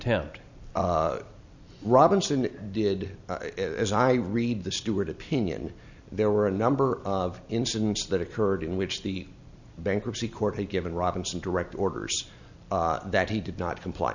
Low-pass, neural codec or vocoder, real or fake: 7.2 kHz; none; real